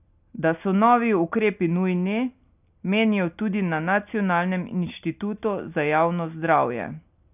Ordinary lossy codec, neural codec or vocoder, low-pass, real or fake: none; none; 3.6 kHz; real